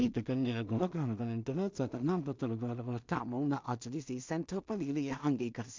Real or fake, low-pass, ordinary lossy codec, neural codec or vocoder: fake; 7.2 kHz; MP3, 64 kbps; codec, 16 kHz in and 24 kHz out, 0.4 kbps, LongCat-Audio-Codec, two codebook decoder